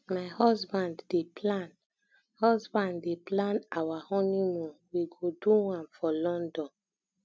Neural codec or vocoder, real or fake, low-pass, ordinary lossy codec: none; real; none; none